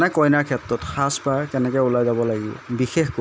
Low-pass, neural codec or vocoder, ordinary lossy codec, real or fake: none; none; none; real